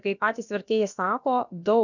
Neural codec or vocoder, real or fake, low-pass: codec, 16 kHz, about 1 kbps, DyCAST, with the encoder's durations; fake; 7.2 kHz